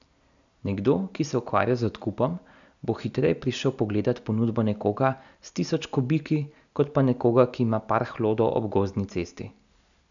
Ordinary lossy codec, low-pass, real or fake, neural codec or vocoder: none; 7.2 kHz; real; none